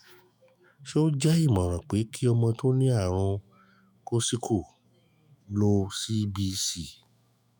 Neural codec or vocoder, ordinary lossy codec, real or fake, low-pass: autoencoder, 48 kHz, 128 numbers a frame, DAC-VAE, trained on Japanese speech; none; fake; none